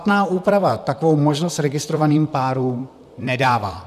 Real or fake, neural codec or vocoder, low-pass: fake; vocoder, 44.1 kHz, 128 mel bands, Pupu-Vocoder; 14.4 kHz